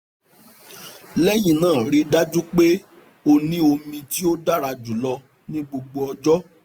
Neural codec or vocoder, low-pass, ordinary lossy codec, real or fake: none; none; none; real